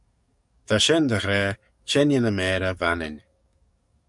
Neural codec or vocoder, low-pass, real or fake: codec, 44.1 kHz, 7.8 kbps, DAC; 10.8 kHz; fake